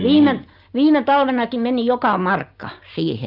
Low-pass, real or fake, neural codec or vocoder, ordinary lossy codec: 5.4 kHz; real; none; Opus, 24 kbps